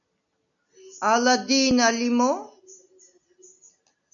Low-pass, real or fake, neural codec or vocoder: 7.2 kHz; real; none